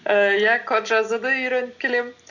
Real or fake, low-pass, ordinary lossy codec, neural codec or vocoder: real; 7.2 kHz; none; none